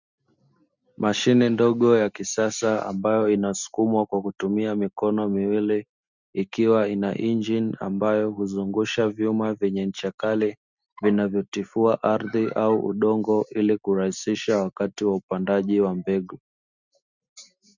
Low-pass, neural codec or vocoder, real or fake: 7.2 kHz; none; real